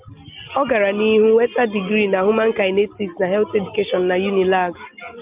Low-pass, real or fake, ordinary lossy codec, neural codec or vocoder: 3.6 kHz; real; Opus, 24 kbps; none